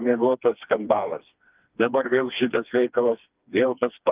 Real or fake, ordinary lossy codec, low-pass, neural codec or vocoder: fake; Opus, 32 kbps; 3.6 kHz; codec, 16 kHz, 2 kbps, FreqCodec, smaller model